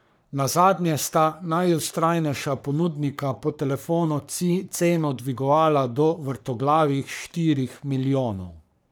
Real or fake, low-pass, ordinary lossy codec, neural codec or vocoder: fake; none; none; codec, 44.1 kHz, 3.4 kbps, Pupu-Codec